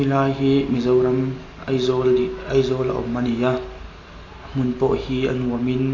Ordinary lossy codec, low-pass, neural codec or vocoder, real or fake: AAC, 32 kbps; 7.2 kHz; none; real